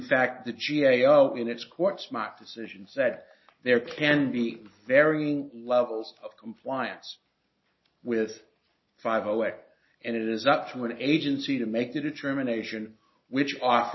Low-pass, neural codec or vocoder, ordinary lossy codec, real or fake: 7.2 kHz; none; MP3, 24 kbps; real